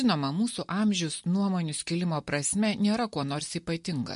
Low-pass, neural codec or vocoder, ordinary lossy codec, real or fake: 10.8 kHz; none; MP3, 48 kbps; real